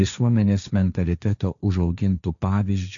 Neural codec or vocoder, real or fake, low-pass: codec, 16 kHz, 1.1 kbps, Voila-Tokenizer; fake; 7.2 kHz